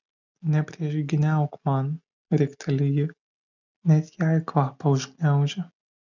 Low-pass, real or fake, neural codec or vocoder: 7.2 kHz; real; none